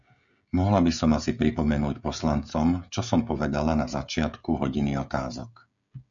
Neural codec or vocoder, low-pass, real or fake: codec, 16 kHz, 16 kbps, FreqCodec, smaller model; 7.2 kHz; fake